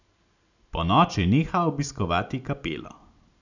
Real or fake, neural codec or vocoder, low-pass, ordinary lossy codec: real; none; 7.2 kHz; none